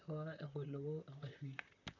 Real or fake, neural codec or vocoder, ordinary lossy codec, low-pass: fake; vocoder, 44.1 kHz, 128 mel bands, Pupu-Vocoder; none; 7.2 kHz